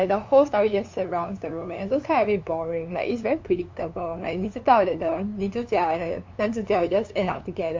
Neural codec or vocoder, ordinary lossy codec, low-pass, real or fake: codec, 16 kHz, 2 kbps, FunCodec, trained on LibriTTS, 25 frames a second; MP3, 48 kbps; 7.2 kHz; fake